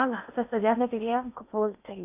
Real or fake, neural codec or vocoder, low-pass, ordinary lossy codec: fake; codec, 16 kHz in and 24 kHz out, 0.6 kbps, FocalCodec, streaming, 4096 codes; 3.6 kHz; AAC, 32 kbps